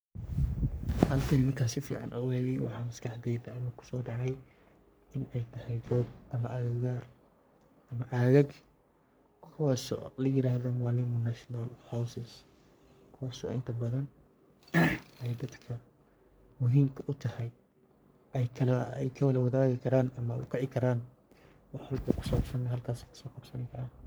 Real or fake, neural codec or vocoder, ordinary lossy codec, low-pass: fake; codec, 44.1 kHz, 3.4 kbps, Pupu-Codec; none; none